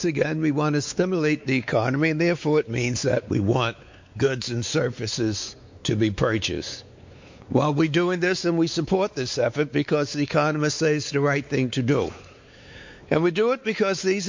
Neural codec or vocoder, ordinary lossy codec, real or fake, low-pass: codec, 16 kHz, 4 kbps, X-Codec, WavLM features, trained on Multilingual LibriSpeech; MP3, 48 kbps; fake; 7.2 kHz